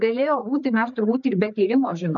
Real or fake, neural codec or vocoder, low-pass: fake; codec, 16 kHz, 4 kbps, FreqCodec, larger model; 7.2 kHz